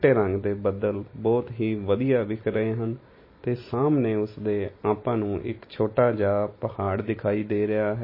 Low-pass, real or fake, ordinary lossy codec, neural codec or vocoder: 5.4 kHz; real; MP3, 24 kbps; none